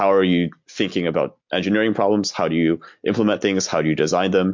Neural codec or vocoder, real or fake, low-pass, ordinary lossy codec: none; real; 7.2 kHz; MP3, 48 kbps